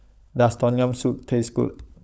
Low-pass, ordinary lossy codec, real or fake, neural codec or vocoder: none; none; fake; codec, 16 kHz, 16 kbps, FunCodec, trained on LibriTTS, 50 frames a second